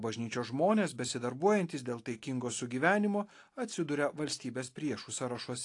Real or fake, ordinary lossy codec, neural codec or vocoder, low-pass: real; AAC, 48 kbps; none; 10.8 kHz